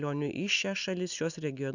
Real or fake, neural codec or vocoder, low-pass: real; none; 7.2 kHz